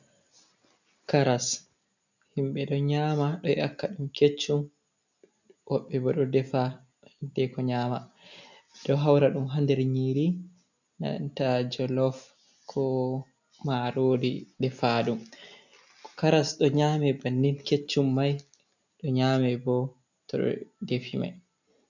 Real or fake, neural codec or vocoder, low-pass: real; none; 7.2 kHz